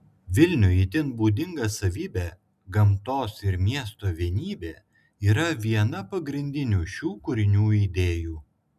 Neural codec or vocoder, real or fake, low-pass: none; real; 14.4 kHz